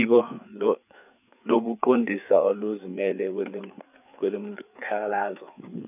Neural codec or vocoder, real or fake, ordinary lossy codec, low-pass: codec, 16 kHz, 4 kbps, FreqCodec, larger model; fake; none; 3.6 kHz